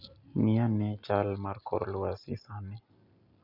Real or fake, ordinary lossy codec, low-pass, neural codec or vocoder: real; Opus, 64 kbps; 5.4 kHz; none